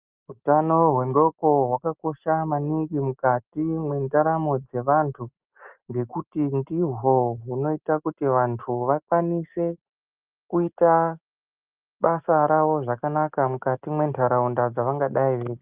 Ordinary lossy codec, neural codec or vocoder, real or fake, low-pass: Opus, 24 kbps; none; real; 3.6 kHz